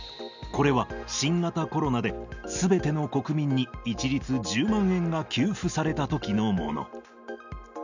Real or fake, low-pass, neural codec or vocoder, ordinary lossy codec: real; 7.2 kHz; none; none